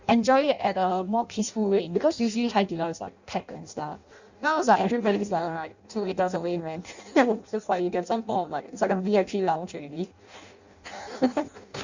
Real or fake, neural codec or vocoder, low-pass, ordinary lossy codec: fake; codec, 16 kHz in and 24 kHz out, 0.6 kbps, FireRedTTS-2 codec; 7.2 kHz; Opus, 64 kbps